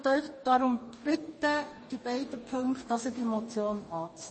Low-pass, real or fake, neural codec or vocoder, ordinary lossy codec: 9.9 kHz; fake; codec, 44.1 kHz, 2.6 kbps, DAC; MP3, 32 kbps